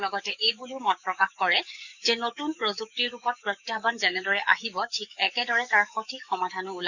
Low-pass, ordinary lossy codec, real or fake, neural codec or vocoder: 7.2 kHz; none; fake; codec, 44.1 kHz, 7.8 kbps, DAC